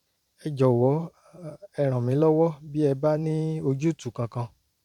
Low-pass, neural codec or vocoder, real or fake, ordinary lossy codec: 19.8 kHz; vocoder, 44.1 kHz, 128 mel bands every 512 samples, BigVGAN v2; fake; none